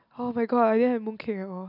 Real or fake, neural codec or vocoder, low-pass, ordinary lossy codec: real; none; 5.4 kHz; none